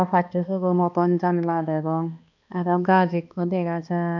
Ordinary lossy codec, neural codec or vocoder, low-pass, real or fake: none; codec, 16 kHz, 2 kbps, X-Codec, HuBERT features, trained on balanced general audio; 7.2 kHz; fake